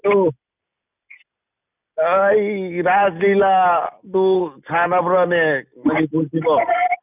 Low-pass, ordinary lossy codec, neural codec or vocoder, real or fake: 3.6 kHz; none; none; real